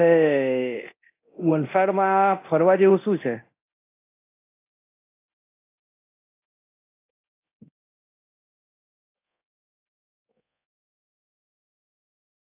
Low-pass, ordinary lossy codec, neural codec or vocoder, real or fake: 3.6 kHz; AAC, 24 kbps; codec, 24 kHz, 0.9 kbps, DualCodec; fake